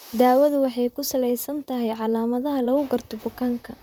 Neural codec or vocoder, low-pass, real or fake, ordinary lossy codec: vocoder, 44.1 kHz, 128 mel bands, Pupu-Vocoder; none; fake; none